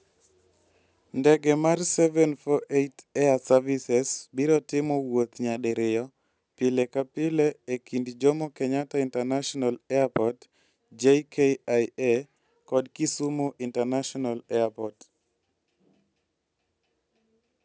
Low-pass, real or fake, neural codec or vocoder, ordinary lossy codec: none; real; none; none